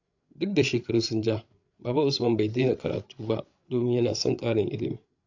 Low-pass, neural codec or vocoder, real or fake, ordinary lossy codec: 7.2 kHz; codec, 16 kHz, 8 kbps, FreqCodec, larger model; fake; AAC, 48 kbps